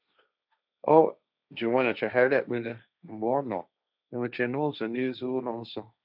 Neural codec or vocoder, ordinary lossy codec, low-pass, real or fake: codec, 16 kHz, 1.1 kbps, Voila-Tokenizer; none; 5.4 kHz; fake